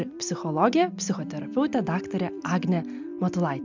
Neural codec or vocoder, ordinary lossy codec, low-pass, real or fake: none; MP3, 64 kbps; 7.2 kHz; real